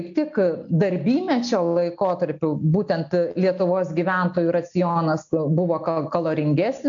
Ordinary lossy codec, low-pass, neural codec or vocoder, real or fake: AAC, 64 kbps; 7.2 kHz; none; real